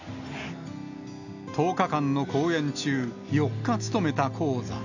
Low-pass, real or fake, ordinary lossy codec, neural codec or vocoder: 7.2 kHz; real; none; none